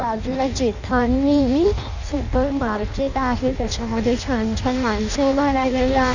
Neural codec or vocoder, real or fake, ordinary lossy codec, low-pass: codec, 16 kHz in and 24 kHz out, 0.6 kbps, FireRedTTS-2 codec; fake; none; 7.2 kHz